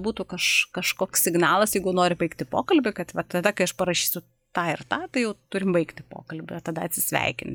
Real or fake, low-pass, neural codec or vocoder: real; 19.8 kHz; none